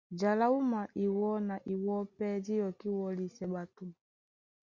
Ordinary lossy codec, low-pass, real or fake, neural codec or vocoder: Opus, 64 kbps; 7.2 kHz; real; none